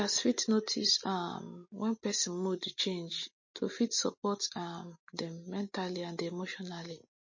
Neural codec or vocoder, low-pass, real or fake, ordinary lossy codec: none; 7.2 kHz; real; MP3, 32 kbps